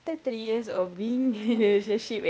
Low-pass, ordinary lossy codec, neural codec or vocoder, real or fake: none; none; codec, 16 kHz, 0.8 kbps, ZipCodec; fake